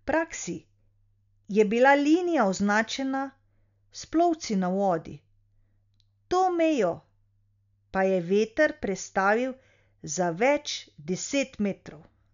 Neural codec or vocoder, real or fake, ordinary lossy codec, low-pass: none; real; none; 7.2 kHz